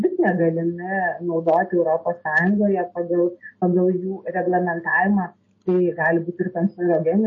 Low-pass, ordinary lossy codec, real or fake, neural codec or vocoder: 7.2 kHz; MP3, 32 kbps; real; none